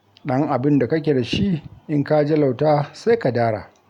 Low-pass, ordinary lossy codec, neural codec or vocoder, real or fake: 19.8 kHz; none; none; real